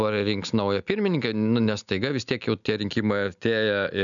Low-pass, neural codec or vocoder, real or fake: 7.2 kHz; none; real